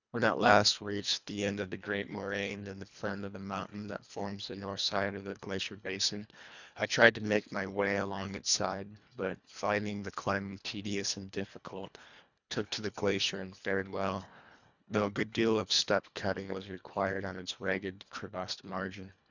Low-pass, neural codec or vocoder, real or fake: 7.2 kHz; codec, 24 kHz, 1.5 kbps, HILCodec; fake